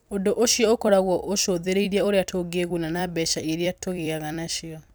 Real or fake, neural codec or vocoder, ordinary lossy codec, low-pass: fake; vocoder, 44.1 kHz, 128 mel bands every 256 samples, BigVGAN v2; none; none